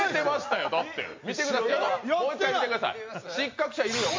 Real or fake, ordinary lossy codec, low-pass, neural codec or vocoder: real; MP3, 48 kbps; 7.2 kHz; none